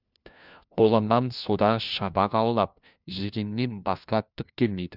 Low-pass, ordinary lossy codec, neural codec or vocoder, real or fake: 5.4 kHz; none; codec, 16 kHz, 1 kbps, FunCodec, trained on LibriTTS, 50 frames a second; fake